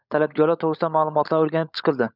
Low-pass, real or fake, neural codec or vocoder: 5.4 kHz; fake; codec, 16 kHz, 16 kbps, FunCodec, trained on LibriTTS, 50 frames a second